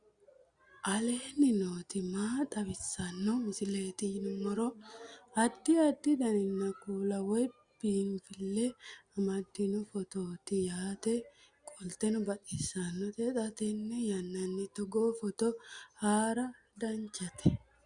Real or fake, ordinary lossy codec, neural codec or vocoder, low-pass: real; Opus, 64 kbps; none; 9.9 kHz